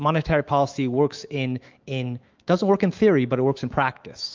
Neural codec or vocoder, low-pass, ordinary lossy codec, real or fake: autoencoder, 48 kHz, 128 numbers a frame, DAC-VAE, trained on Japanese speech; 7.2 kHz; Opus, 24 kbps; fake